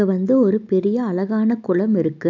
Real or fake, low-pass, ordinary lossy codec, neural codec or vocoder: real; 7.2 kHz; none; none